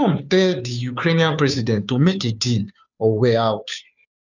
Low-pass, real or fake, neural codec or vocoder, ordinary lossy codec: 7.2 kHz; fake; codec, 16 kHz, 2 kbps, FunCodec, trained on Chinese and English, 25 frames a second; none